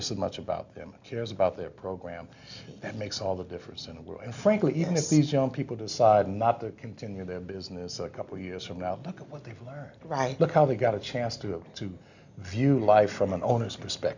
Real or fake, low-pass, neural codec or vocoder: real; 7.2 kHz; none